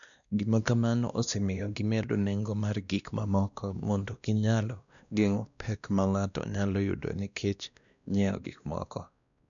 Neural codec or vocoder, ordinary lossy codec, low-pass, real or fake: codec, 16 kHz, 1 kbps, X-Codec, WavLM features, trained on Multilingual LibriSpeech; none; 7.2 kHz; fake